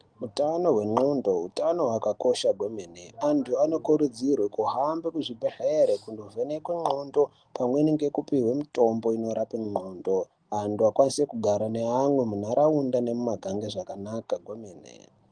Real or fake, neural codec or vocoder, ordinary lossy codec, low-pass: real; none; Opus, 24 kbps; 9.9 kHz